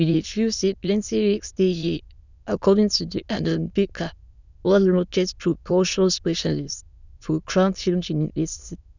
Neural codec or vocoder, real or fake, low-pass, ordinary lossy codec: autoencoder, 22.05 kHz, a latent of 192 numbers a frame, VITS, trained on many speakers; fake; 7.2 kHz; none